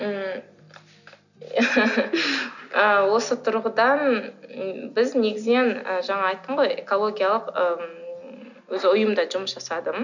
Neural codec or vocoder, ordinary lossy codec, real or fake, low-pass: none; none; real; 7.2 kHz